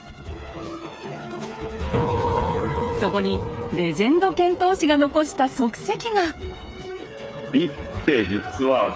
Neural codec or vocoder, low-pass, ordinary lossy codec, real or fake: codec, 16 kHz, 4 kbps, FreqCodec, smaller model; none; none; fake